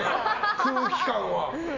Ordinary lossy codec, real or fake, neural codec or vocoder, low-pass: none; real; none; 7.2 kHz